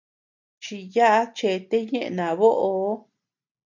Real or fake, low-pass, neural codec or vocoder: real; 7.2 kHz; none